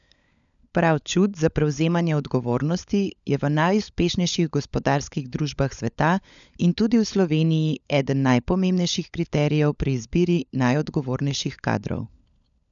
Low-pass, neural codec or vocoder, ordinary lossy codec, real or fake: 7.2 kHz; codec, 16 kHz, 16 kbps, FunCodec, trained on LibriTTS, 50 frames a second; none; fake